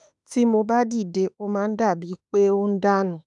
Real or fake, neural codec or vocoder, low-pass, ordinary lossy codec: fake; autoencoder, 48 kHz, 32 numbers a frame, DAC-VAE, trained on Japanese speech; 10.8 kHz; none